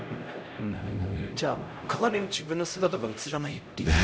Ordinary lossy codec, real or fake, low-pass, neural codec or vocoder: none; fake; none; codec, 16 kHz, 0.5 kbps, X-Codec, HuBERT features, trained on LibriSpeech